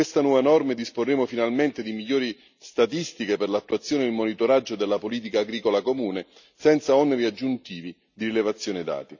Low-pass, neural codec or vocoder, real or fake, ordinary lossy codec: 7.2 kHz; none; real; none